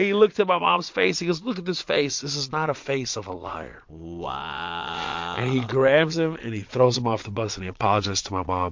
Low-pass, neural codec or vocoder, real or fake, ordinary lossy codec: 7.2 kHz; vocoder, 22.05 kHz, 80 mel bands, Vocos; fake; MP3, 48 kbps